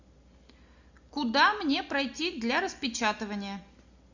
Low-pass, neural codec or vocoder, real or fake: 7.2 kHz; none; real